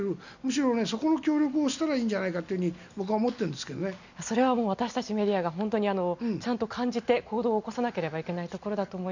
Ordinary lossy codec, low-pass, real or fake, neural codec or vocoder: none; 7.2 kHz; real; none